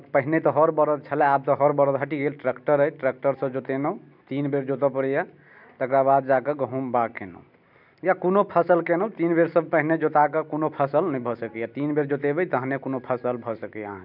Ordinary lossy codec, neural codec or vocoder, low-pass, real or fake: none; none; 5.4 kHz; real